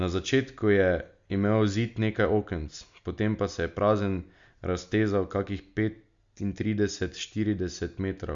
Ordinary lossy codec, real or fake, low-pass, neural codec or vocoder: Opus, 64 kbps; real; 7.2 kHz; none